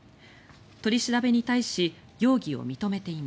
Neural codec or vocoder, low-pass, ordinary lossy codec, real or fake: none; none; none; real